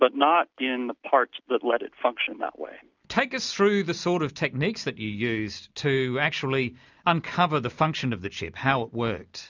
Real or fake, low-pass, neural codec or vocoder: real; 7.2 kHz; none